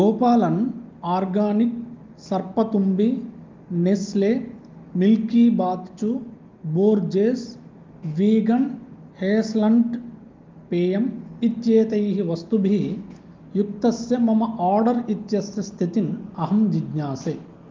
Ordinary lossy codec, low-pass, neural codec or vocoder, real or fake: Opus, 32 kbps; 7.2 kHz; none; real